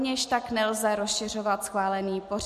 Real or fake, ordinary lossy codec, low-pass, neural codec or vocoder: real; MP3, 64 kbps; 14.4 kHz; none